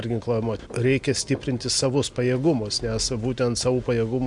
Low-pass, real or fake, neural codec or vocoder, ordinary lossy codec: 10.8 kHz; real; none; MP3, 64 kbps